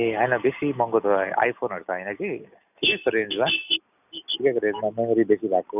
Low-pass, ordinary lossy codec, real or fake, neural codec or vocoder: 3.6 kHz; none; real; none